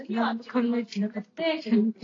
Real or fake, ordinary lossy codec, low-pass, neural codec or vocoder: real; AAC, 32 kbps; 7.2 kHz; none